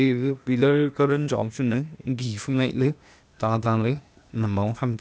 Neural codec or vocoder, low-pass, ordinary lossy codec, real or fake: codec, 16 kHz, 0.8 kbps, ZipCodec; none; none; fake